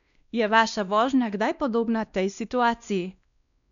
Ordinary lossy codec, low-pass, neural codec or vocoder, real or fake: none; 7.2 kHz; codec, 16 kHz, 1 kbps, X-Codec, WavLM features, trained on Multilingual LibriSpeech; fake